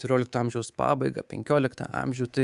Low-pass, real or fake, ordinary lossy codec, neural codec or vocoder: 10.8 kHz; fake; Opus, 64 kbps; codec, 24 kHz, 3.1 kbps, DualCodec